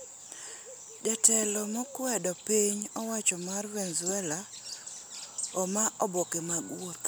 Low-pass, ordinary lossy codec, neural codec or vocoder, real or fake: none; none; none; real